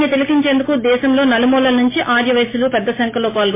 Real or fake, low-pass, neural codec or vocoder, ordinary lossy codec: real; 3.6 kHz; none; MP3, 16 kbps